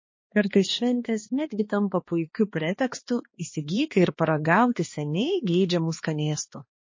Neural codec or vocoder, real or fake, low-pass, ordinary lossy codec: codec, 16 kHz, 2 kbps, X-Codec, HuBERT features, trained on balanced general audio; fake; 7.2 kHz; MP3, 32 kbps